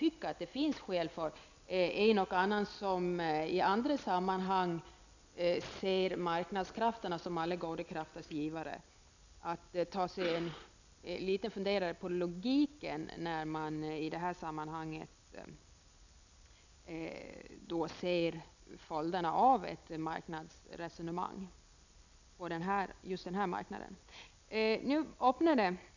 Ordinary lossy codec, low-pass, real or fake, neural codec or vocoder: none; 7.2 kHz; real; none